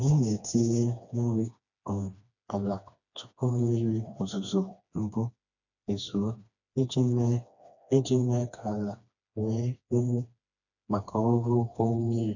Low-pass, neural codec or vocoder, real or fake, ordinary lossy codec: 7.2 kHz; codec, 16 kHz, 2 kbps, FreqCodec, smaller model; fake; none